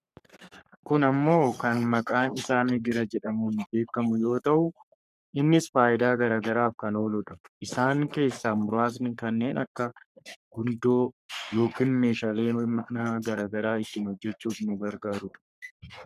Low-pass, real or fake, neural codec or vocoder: 14.4 kHz; fake; codec, 44.1 kHz, 3.4 kbps, Pupu-Codec